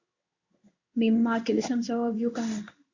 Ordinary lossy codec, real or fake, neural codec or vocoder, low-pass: Opus, 64 kbps; fake; codec, 16 kHz in and 24 kHz out, 1 kbps, XY-Tokenizer; 7.2 kHz